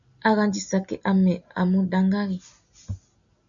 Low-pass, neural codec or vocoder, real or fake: 7.2 kHz; none; real